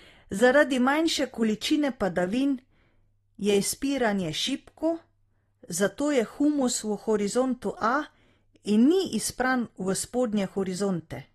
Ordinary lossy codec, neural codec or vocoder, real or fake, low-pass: AAC, 32 kbps; none; real; 19.8 kHz